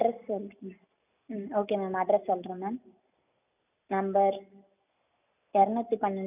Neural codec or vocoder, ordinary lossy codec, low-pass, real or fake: none; none; 3.6 kHz; real